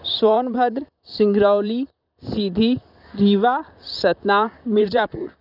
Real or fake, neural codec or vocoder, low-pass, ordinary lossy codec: fake; vocoder, 44.1 kHz, 128 mel bands, Pupu-Vocoder; 5.4 kHz; none